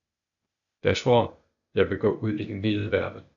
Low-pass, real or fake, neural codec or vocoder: 7.2 kHz; fake; codec, 16 kHz, 0.8 kbps, ZipCodec